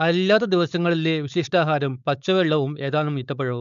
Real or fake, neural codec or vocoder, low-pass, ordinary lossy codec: fake; codec, 16 kHz, 4.8 kbps, FACodec; 7.2 kHz; none